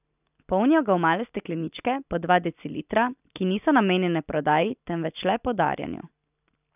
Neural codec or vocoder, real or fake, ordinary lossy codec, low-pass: none; real; none; 3.6 kHz